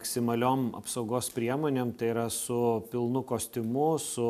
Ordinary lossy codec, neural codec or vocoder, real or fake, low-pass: AAC, 96 kbps; none; real; 14.4 kHz